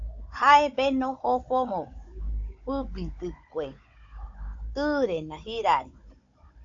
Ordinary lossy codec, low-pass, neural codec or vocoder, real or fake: AAC, 48 kbps; 7.2 kHz; codec, 16 kHz, 16 kbps, FunCodec, trained on Chinese and English, 50 frames a second; fake